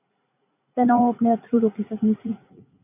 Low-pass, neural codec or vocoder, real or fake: 3.6 kHz; vocoder, 44.1 kHz, 80 mel bands, Vocos; fake